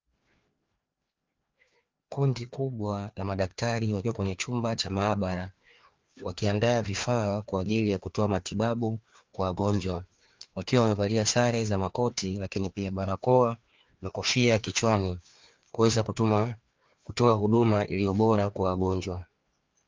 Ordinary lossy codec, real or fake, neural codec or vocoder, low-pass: Opus, 24 kbps; fake; codec, 16 kHz, 2 kbps, FreqCodec, larger model; 7.2 kHz